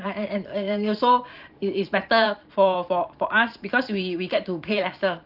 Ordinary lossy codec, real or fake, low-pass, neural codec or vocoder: Opus, 32 kbps; fake; 5.4 kHz; vocoder, 44.1 kHz, 128 mel bands every 512 samples, BigVGAN v2